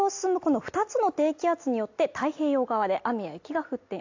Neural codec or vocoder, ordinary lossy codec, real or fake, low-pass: none; AAC, 48 kbps; real; 7.2 kHz